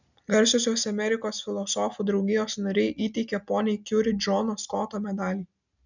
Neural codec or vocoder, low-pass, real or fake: none; 7.2 kHz; real